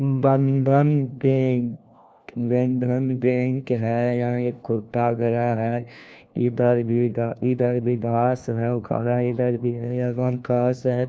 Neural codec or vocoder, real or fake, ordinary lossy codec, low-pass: codec, 16 kHz, 1 kbps, FunCodec, trained on LibriTTS, 50 frames a second; fake; none; none